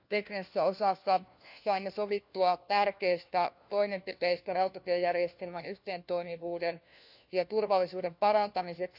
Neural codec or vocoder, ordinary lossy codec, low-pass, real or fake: codec, 16 kHz, 1 kbps, FunCodec, trained on LibriTTS, 50 frames a second; none; 5.4 kHz; fake